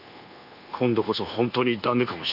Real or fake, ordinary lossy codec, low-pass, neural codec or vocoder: fake; none; 5.4 kHz; codec, 24 kHz, 1.2 kbps, DualCodec